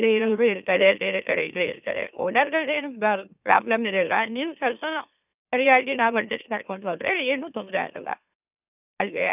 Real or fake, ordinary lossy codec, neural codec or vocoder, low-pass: fake; none; autoencoder, 44.1 kHz, a latent of 192 numbers a frame, MeloTTS; 3.6 kHz